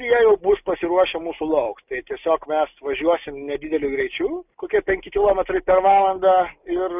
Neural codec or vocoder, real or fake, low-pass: none; real; 3.6 kHz